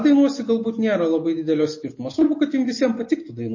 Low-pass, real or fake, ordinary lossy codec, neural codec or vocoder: 7.2 kHz; real; MP3, 32 kbps; none